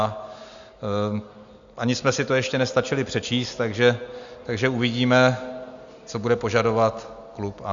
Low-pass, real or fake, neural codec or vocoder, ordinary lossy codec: 7.2 kHz; real; none; Opus, 64 kbps